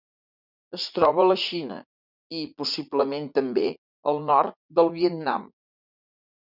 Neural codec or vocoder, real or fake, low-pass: vocoder, 44.1 kHz, 80 mel bands, Vocos; fake; 5.4 kHz